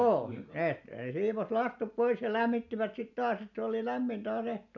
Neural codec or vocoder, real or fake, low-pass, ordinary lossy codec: none; real; 7.2 kHz; none